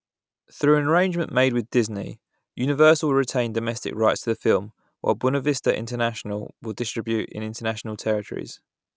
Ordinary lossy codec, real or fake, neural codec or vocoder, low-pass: none; real; none; none